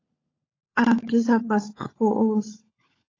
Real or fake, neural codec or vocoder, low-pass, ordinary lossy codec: fake; codec, 16 kHz, 16 kbps, FunCodec, trained on LibriTTS, 50 frames a second; 7.2 kHz; AAC, 32 kbps